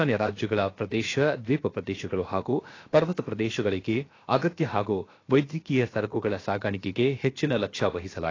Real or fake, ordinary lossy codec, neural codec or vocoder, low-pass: fake; AAC, 32 kbps; codec, 16 kHz, about 1 kbps, DyCAST, with the encoder's durations; 7.2 kHz